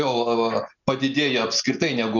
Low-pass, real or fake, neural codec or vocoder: 7.2 kHz; real; none